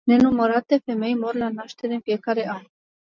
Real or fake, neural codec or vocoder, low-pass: real; none; 7.2 kHz